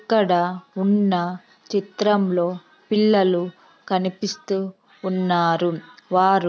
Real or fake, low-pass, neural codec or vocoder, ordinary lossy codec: real; none; none; none